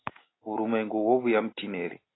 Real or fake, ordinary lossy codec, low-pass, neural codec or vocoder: real; AAC, 16 kbps; 7.2 kHz; none